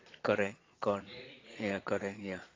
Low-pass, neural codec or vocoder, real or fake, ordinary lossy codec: 7.2 kHz; vocoder, 22.05 kHz, 80 mel bands, Vocos; fake; AAC, 32 kbps